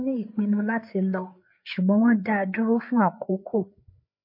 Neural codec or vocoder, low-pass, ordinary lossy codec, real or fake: codec, 16 kHz, 4 kbps, FreqCodec, larger model; 5.4 kHz; MP3, 32 kbps; fake